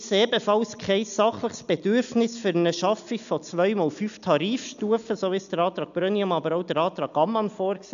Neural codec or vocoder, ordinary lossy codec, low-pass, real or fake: none; MP3, 96 kbps; 7.2 kHz; real